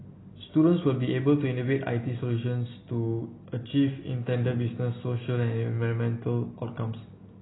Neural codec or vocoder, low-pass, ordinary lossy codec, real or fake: none; 7.2 kHz; AAC, 16 kbps; real